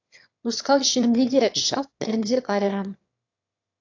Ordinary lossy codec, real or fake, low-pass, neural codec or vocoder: AAC, 48 kbps; fake; 7.2 kHz; autoencoder, 22.05 kHz, a latent of 192 numbers a frame, VITS, trained on one speaker